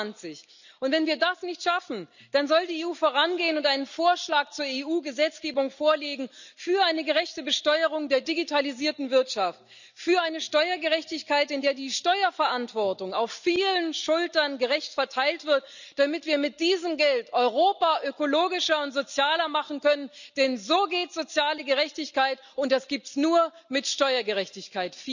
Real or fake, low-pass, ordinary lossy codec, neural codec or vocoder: real; 7.2 kHz; none; none